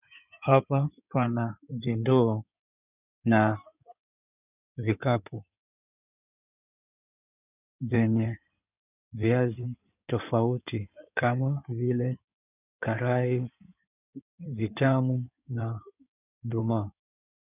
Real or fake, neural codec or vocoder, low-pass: fake; codec, 16 kHz in and 24 kHz out, 2.2 kbps, FireRedTTS-2 codec; 3.6 kHz